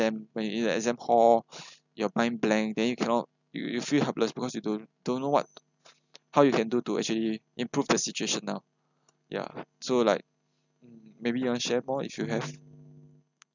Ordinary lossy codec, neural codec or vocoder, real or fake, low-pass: none; none; real; 7.2 kHz